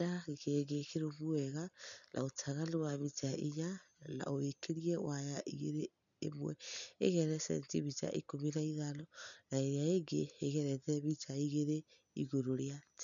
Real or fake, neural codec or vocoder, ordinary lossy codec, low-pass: real; none; none; 7.2 kHz